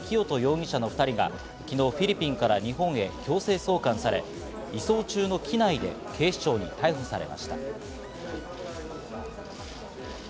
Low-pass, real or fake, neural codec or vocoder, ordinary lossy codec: none; real; none; none